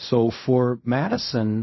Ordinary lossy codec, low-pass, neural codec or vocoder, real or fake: MP3, 24 kbps; 7.2 kHz; codec, 16 kHz in and 24 kHz out, 0.4 kbps, LongCat-Audio-Codec, fine tuned four codebook decoder; fake